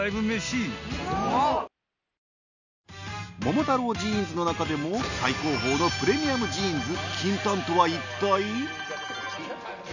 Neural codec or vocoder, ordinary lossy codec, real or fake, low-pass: none; none; real; 7.2 kHz